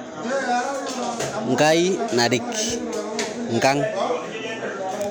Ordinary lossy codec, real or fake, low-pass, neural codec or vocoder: none; real; none; none